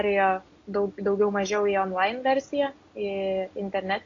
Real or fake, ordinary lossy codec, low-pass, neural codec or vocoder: real; AAC, 48 kbps; 7.2 kHz; none